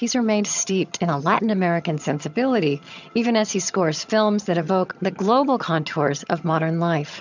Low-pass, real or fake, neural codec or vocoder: 7.2 kHz; fake; vocoder, 22.05 kHz, 80 mel bands, HiFi-GAN